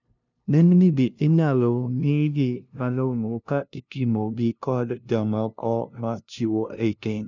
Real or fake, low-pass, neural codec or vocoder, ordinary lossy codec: fake; 7.2 kHz; codec, 16 kHz, 0.5 kbps, FunCodec, trained on LibriTTS, 25 frames a second; none